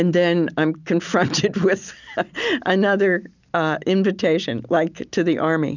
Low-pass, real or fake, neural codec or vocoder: 7.2 kHz; real; none